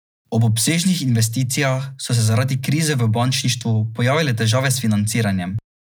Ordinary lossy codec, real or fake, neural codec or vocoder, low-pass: none; real; none; none